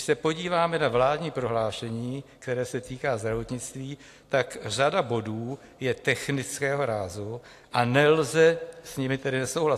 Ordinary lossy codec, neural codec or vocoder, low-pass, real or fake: AAC, 64 kbps; none; 14.4 kHz; real